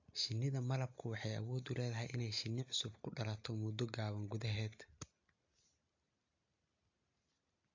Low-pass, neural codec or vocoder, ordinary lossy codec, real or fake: 7.2 kHz; none; AAC, 48 kbps; real